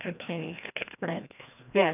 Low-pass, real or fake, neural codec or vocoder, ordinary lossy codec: 3.6 kHz; fake; codec, 16 kHz, 1 kbps, FreqCodec, larger model; none